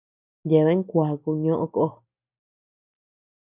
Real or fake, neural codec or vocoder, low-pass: real; none; 3.6 kHz